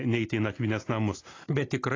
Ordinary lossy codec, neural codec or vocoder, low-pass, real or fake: AAC, 32 kbps; none; 7.2 kHz; real